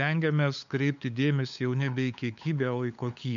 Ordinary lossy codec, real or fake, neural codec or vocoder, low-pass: MP3, 64 kbps; fake; codec, 16 kHz, 8 kbps, FunCodec, trained on LibriTTS, 25 frames a second; 7.2 kHz